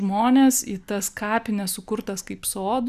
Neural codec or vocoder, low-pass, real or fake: none; 14.4 kHz; real